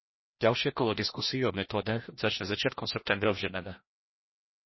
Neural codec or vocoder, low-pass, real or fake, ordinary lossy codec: codec, 16 kHz, 1 kbps, FreqCodec, larger model; 7.2 kHz; fake; MP3, 24 kbps